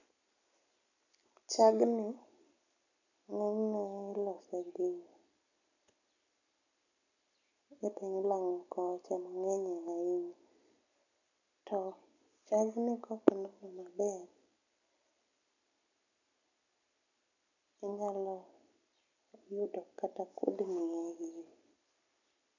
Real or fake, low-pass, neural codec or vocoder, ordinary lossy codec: real; 7.2 kHz; none; none